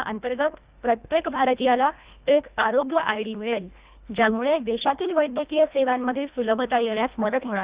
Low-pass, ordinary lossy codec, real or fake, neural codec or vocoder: 3.6 kHz; Opus, 64 kbps; fake; codec, 24 kHz, 1.5 kbps, HILCodec